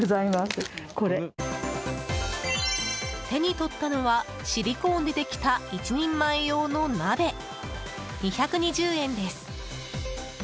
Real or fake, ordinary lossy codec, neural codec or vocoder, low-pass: real; none; none; none